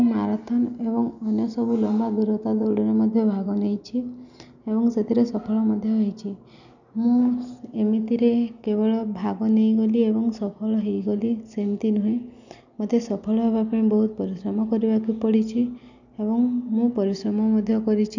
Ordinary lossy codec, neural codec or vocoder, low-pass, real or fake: none; none; 7.2 kHz; real